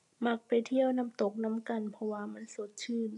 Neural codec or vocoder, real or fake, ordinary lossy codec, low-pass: none; real; none; 10.8 kHz